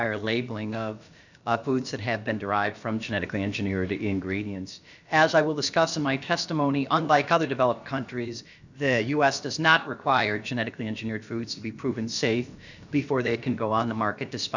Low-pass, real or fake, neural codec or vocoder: 7.2 kHz; fake; codec, 16 kHz, about 1 kbps, DyCAST, with the encoder's durations